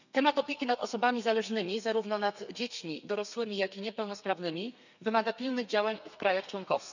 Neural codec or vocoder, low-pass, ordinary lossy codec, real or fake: codec, 32 kHz, 1.9 kbps, SNAC; 7.2 kHz; none; fake